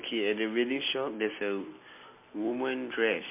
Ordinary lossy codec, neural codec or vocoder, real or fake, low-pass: MP3, 24 kbps; none; real; 3.6 kHz